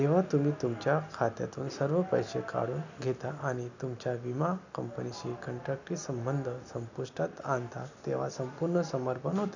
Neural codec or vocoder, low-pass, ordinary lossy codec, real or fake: none; 7.2 kHz; none; real